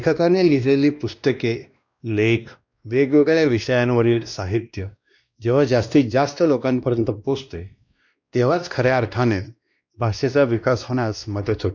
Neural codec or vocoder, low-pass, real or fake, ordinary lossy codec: codec, 16 kHz, 1 kbps, X-Codec, WavLM features, trained on Multilingual LibriSpeech; 7.2 kHz; fake; none